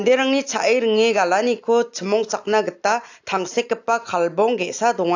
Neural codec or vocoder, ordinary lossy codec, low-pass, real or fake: none; AAC, 48 kbps; 7.2 kHz; real